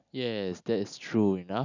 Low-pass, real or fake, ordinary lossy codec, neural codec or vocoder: 7.2 kHz; real; none; none